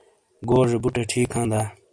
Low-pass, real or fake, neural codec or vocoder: 9.9 kHz; real; none